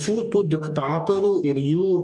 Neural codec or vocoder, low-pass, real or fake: codec, 44.1 kHz, 2.6 kbps, DAC; 10.8 kHz; fake